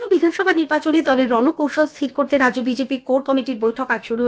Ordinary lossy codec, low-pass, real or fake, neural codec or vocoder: none; none; fake; codec, 16 kHz, about 1 kbps, DyCAST, with the encoder's durations